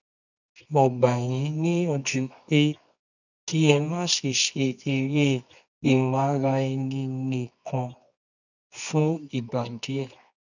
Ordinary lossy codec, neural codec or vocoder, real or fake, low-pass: none; codec, 24 kHz, 0.9 kbps, WavTokenizer, medium music audio release; fake; 7.2 kHz